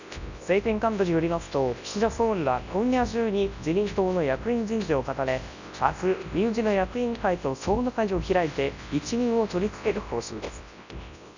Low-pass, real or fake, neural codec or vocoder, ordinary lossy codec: 7.2 kHz; fake; codec, 24 kHz, 0.9 kbps, WavTokenizer, large speech release; none